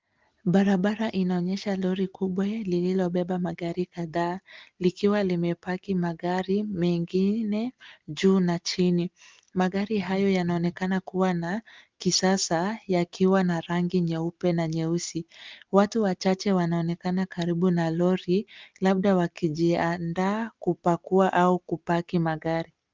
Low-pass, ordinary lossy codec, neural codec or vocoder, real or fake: 7.2 kHz; Opus, 24 kbps; none; real